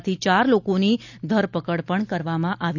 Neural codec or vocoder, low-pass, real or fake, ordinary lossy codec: none; 7.2 kHz; real; none